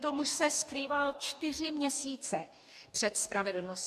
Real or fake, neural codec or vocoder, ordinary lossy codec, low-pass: fake; codec, 44.1 kHz, 2.6 kbps, DAC; AAC, 96 kbps; 14.4 kHz